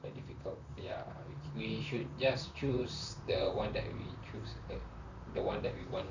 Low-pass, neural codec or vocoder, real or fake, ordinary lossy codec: 7.2 kHz; vocoder, 44.1 kHz, 128 mel bands, Pupu-Vocoder; fake; none